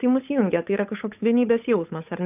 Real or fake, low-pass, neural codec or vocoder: fake; 3.6 kHz; codec, 16 kHz, 8 kbps, FunCodec, trained on Chinese and English, 25 frames a second